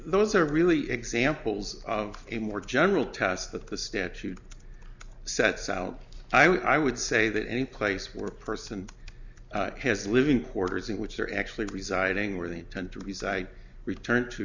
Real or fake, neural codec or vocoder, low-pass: real; none; 7.2 kHz